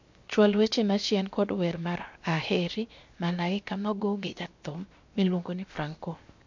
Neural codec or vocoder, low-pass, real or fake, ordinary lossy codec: codec, 16 kHz, 0.7 kbps, FocalCodec; 7.2 kHz; fake; MP3, 48 kbps